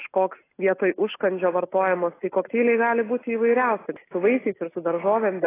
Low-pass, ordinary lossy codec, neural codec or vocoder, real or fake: 3.6 kHz; AAC, 16 kbps; none; real